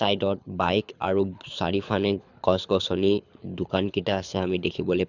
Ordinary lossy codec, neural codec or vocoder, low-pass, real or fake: none; codec, 24 kHz, 6 kbps, HILCodec; 7.2 kHz; fake